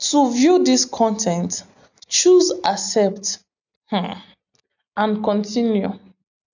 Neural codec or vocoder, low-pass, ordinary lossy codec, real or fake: none; 7.2 kHz; none; real